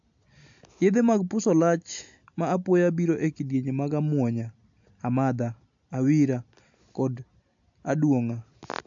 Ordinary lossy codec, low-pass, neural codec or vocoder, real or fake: AAC, 64 kbps; 7.2 kHz; none; real